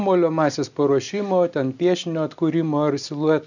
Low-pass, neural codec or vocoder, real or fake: 7.2 kHz; none; real